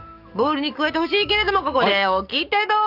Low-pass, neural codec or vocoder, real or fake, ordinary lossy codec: 5.4 kHz; codec, 44.1 kHz, 7.8 kbps, DAC; fake; none